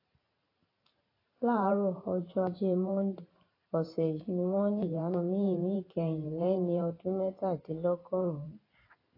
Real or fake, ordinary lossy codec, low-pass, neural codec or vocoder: fake; AAC, 24 kbps; 5.4 kHz; vocoder, 44.1 kHz, 128 mel bands every 512 samples, BigVGAN v2